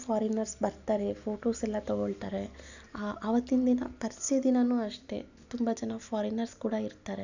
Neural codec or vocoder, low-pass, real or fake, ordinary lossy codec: none; 7.2 kHz; real; none